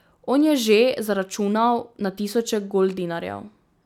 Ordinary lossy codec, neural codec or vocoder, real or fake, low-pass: none; none; real; 19.8 kHz